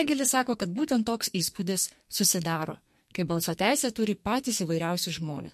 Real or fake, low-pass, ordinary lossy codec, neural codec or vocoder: fake; 14.4 kHz; MP3, 64 kbps; codec, 44.1 kHz, 2.6 kbps, SNAC